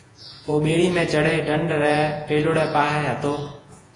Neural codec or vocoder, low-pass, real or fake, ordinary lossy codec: vocoder, 48 kHz, 128 mel bands, Vocos; 10.8 kHz; fake; AAC, 32 kbps